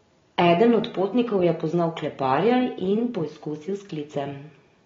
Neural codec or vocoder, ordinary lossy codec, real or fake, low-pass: none; AAC, 24 kbps; real; 7.2 kHz